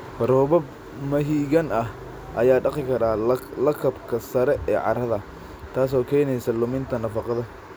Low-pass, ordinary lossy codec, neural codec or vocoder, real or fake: none; none; none; real